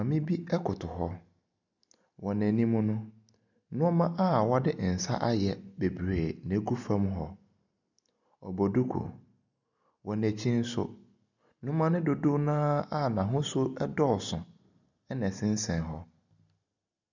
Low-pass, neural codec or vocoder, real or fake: 7.2 kHz; none; real